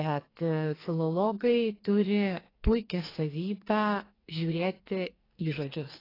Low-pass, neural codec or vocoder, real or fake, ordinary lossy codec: 5.4 kHz; codec, 44.1 kHz, 2.6 kbps, SNAC; fake; AAC, 24 kbps